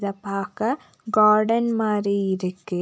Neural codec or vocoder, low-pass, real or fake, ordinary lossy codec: none; none; real; none